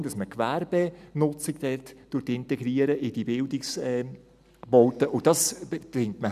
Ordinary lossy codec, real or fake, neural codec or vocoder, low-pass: none; real; none; 14.4 kHz